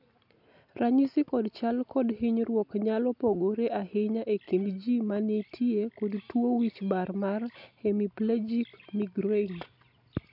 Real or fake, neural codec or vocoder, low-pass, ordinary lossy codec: real; none; 5.4 kHz; none